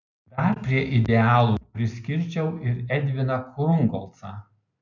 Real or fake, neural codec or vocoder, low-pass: real; none; 7.2 kHz